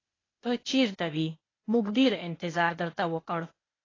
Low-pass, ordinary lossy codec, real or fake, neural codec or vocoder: 7.2 kHz; AAC, 32 kbps; fake; codec, 16 kHz, 0.8 kbps, ZipCodec